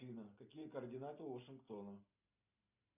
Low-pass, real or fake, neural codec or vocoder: 3.6 kHz; real; none